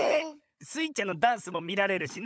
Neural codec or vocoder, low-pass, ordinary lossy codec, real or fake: codec, 16 kHz, 16 kbps, FunCodec, trained on LibriTTS, 50 frames a second; none; none; fake